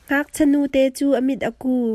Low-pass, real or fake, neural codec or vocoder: 14.4 kHz; real; none